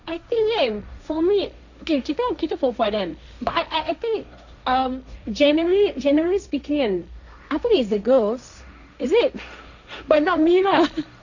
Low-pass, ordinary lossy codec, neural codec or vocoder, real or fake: 7.2 kHz; none; codec, 16 kHz, 1.1 kbps, Voila-Tokenizer; fake